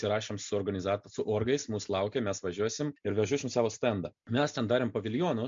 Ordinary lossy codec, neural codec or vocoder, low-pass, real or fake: MP3, 48 kbps; none; 7.2 kHz; real